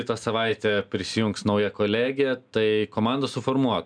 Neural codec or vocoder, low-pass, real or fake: none; 9.9 kHz; real